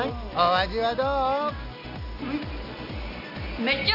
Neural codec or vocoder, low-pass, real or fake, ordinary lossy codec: none; 5.4 kHz; real; none